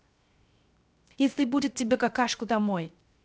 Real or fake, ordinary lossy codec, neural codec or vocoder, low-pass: fake; none; codec, 16 kHz, 0.3 kbps, FocalCodec; none